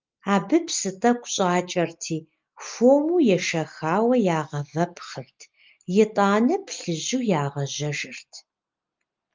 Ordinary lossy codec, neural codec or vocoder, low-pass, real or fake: Opus, 32 kbps; none; 7.2 kHz; real